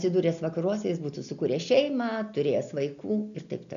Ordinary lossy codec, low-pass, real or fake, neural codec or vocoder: MP3, 96 kbps; 7.2 kHz; real; none